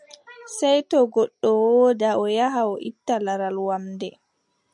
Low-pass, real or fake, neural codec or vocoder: 10.8 kHz; real; none